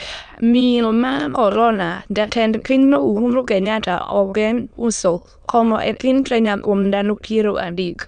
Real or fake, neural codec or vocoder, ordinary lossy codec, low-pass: fake; autoencoder, 22.05 kHz, a latent of 192 numbers a frame, VITS, trained on many speakers; none; 9.9 kHz